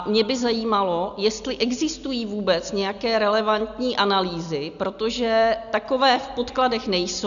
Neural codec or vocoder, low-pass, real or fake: none; 7.2 kHz; real